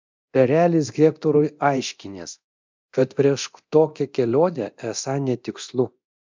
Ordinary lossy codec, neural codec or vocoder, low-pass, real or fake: MP3, 64 kbps; codec, 24 kHz, 0.9 kbps, DualCodec; 7.2 kHz; fake